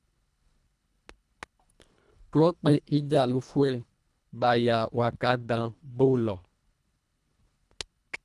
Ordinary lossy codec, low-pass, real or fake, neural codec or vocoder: none; none; fake; codec, 24 kHz, 1.5 kbps, HILCodec